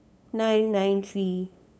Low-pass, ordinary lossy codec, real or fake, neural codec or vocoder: none; none; fake; codec, 16 kHz, 8 kbps, FunCodec, trained on LibriTTS, 25 frames a second